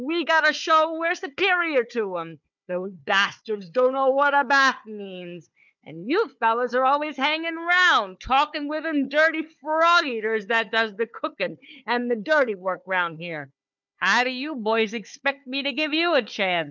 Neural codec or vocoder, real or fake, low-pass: codec, 16 kHz, 4 kbps, FunCodec, trained on Chinese and English, 50 frames a second; fake; 7.2 kHz